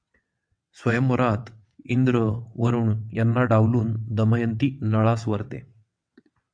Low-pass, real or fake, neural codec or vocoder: 9.9 kHz; fake; vocoder, 22.05 kHz, 80 mel bands, WaveNeXt